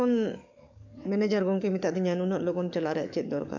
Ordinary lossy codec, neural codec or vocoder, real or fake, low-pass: none; codec, 44.1 kHz, 7.8 kbps, DAC; fake; 7.2 kHz